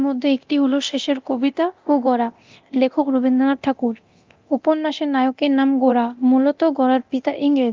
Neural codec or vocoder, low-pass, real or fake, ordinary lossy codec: codec, 24 kHz, 0.9 kbps, DualCodec; 7.2 kHz; fake; Opus, 32 kbps